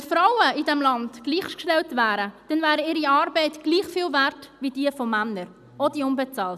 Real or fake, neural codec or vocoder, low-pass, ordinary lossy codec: real; none; 14.4 kHz; none